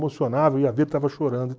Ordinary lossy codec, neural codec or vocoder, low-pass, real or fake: none; none; none; real